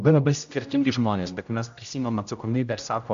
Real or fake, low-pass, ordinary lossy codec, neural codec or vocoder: fake; 7.2 kHz; AAC, 64 kbps; codec, 16 kHz, 0.5 kbps, X-Codec, HuBERT features, trained on general audio